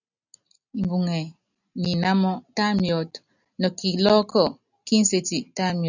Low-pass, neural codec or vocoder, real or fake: 7.2 kHz; none; real